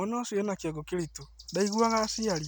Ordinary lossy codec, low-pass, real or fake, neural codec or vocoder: none; none; real; none